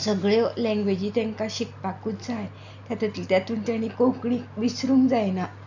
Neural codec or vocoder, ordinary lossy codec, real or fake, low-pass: none; none; real; 7.2 kHz